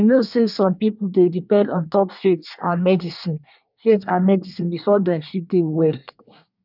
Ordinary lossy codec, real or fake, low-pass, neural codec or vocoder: none; fake; 5.4 kHz; codec, 24 kHz, 1 kbps, SNAC